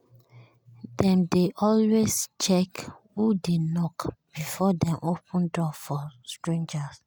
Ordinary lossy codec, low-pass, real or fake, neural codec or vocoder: none; none; real; none